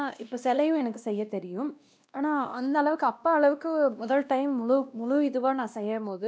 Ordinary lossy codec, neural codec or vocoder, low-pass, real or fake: none; codec, 16 kHz, 1 kbps, X-Codec, WavLM features, trained on Multilingual LibriSpeech; none; fake